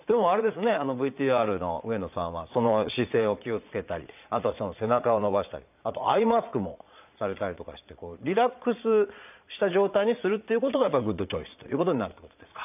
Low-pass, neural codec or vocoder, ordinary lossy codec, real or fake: 3.6 kHz; vocoder, 22.05 kHz, 80 mel bands, Vocos; none; fake